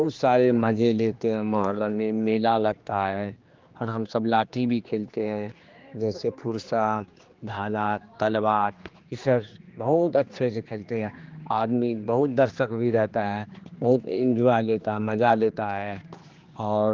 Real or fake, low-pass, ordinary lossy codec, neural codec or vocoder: fake; 7.2 kHz; Opus, 32 kbps; codec, 16 kHz, 2 kbps, X-Codec, HuBERT features, trained on general audio